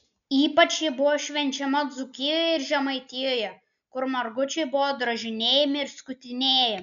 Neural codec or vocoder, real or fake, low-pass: none; real; 7.2 kHz